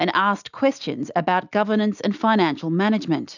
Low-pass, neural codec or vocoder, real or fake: 7.2 kHz; none; real